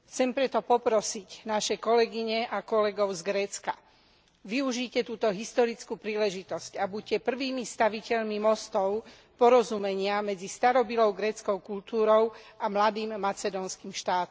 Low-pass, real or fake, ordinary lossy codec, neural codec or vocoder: none; real; none; none